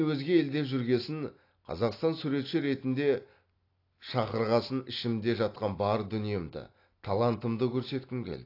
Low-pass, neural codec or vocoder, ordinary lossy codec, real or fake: 5.4 kHz; none; AAC, 32 kbps; real